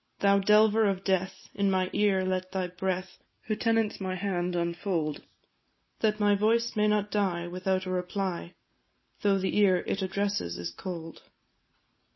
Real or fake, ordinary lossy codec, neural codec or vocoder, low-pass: real; MP3, 24 kbps; none; 7.2 kHz